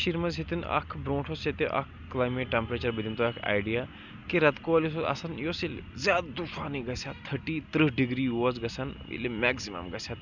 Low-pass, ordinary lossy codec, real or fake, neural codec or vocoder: 7.2 kHz; none; real; none